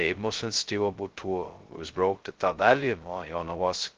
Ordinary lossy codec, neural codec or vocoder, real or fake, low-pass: Opus, 16 kbps; codec, 16 kHz, 0.2 kbps, FocalCodec; fake; 7.2 kHz